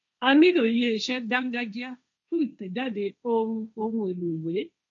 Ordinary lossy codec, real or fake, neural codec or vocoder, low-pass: AAC, 64 kbps; fake; codec, 16 kHz, 1.1 kbps, Voila-Tokenizer; 7.2 kHz